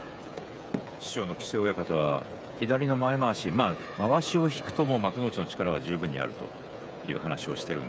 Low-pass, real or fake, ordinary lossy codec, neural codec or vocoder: none; fake; none; codec, 16 kHz, 8 kbps, FreqCodec, smaller model